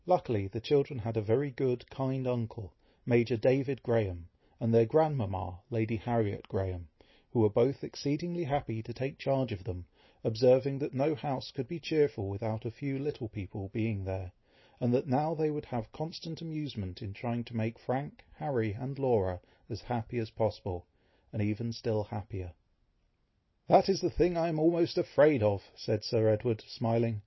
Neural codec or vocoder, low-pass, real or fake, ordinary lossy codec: none; 7.2 kHz; real; MP3, 24 kbps